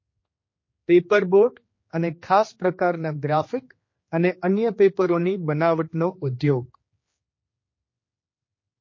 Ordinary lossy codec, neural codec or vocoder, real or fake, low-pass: MP3, 32 kbps; codec, 16 kHz, 2 kbps, X-Codec, HuBERT features, trained on general audio; fake; 7.2 kHz